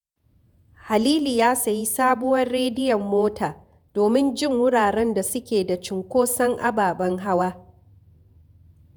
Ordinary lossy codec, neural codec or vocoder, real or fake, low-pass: none; vocoder, 48 kHz, 128 mel bands, Vocos; fake; none